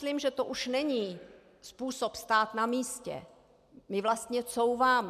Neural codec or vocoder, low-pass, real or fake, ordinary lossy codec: none; 14.4 kHz; real; MP3, 96 kbps